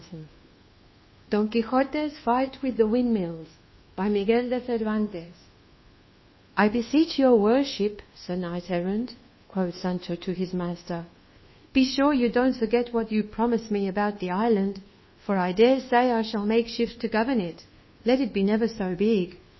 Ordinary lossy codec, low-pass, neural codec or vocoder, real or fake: MP3, 24 kbps; 7.2 kHz; codec, 24 kHz, 1.2 kbps, DualCodec; fake